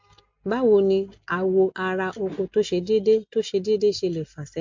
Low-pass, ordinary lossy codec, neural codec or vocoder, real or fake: 7.2 kHz; MP3, 48 kbps; none; real